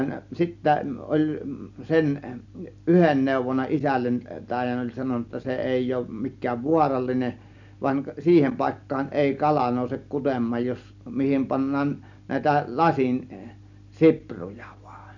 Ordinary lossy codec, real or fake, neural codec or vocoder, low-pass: none; real; none; 7.2 kHz